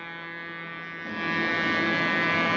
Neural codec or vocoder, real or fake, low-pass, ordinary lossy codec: none; real; 7.2 kHz; AAC, 32 kbps